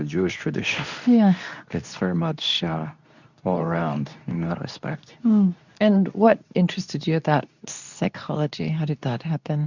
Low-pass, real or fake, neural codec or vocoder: 7.2 kHz; fake; codec, 24 kHz, 0.9 kbps, WavTokenizer, medium speech release version 2